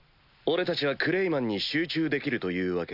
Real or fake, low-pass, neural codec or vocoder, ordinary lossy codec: real; 5.4 kHz; none; none